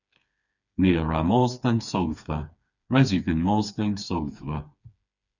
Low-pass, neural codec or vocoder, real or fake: 7.2 kHz; codec, 16 kHz, 4 kbps, FreqCodec, smaller model; fake